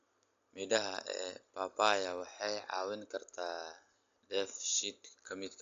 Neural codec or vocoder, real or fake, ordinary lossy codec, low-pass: none; real; AAC, 48 kbps; 7.2 kHz